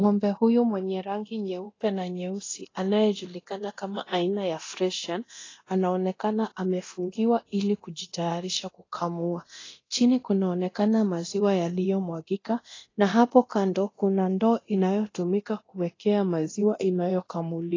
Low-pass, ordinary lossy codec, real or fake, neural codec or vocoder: 7.2 kHz; AAC, 32 kbps; fake; codec, 24 kHz, 0.9 kbps, DualCodec